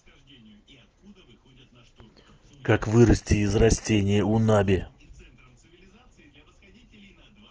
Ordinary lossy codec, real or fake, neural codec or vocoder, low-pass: Opus, 16 kbps; real; none; 7.2 kHz